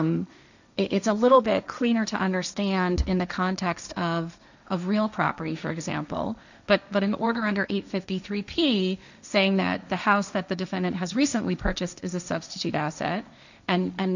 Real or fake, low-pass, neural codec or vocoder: fake; 7.2 kHz; codec, 16 kHz, 1.1 kbps, Voila-Tokenizer